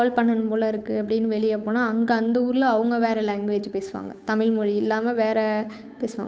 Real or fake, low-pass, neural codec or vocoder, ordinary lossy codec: fake; none; codec, 16 kHz, 8 kbps, FunCodec, trained on Chinese and English, 25 frames a second; none